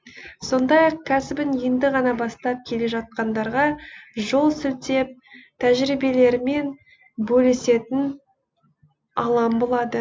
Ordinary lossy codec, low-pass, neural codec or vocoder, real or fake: none; none; none; real